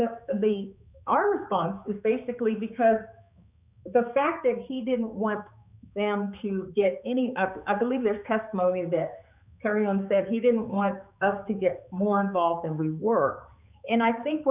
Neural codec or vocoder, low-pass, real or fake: codec, 16 kHz, 4 kbps, X-Codec, HuBERT features, trained on balanced general audio; 3.6 kHz; fake